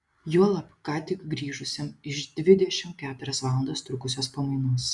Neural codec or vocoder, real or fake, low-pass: none; real; 10.8 kHz